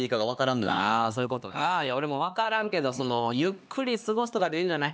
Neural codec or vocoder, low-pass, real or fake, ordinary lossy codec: codec, 16 kHz, 2 kbps, X-Codec, HuBERT features, trained on LibriSpeech; none; fake; none